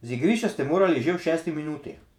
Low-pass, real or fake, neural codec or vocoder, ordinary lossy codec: 19.8 kHz; fake; vocoder, 48 kHz, 128 mel bands, Vocos; none